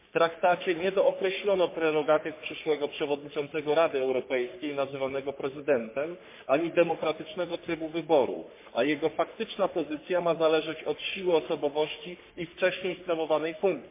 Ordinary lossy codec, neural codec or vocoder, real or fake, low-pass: MP3, 24 kbps; codec, 44.1 kHz, 3.4 kbps, Pupu-Codec; fake; 3.6 kHz